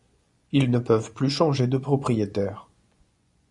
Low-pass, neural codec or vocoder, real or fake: 10.8 kHz; vocoder, 24 kHz, 100 mel bands, Vocos; fake